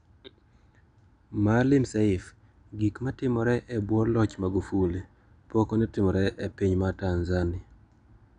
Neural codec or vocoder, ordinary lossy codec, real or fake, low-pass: none; none; real; 9.9 kHz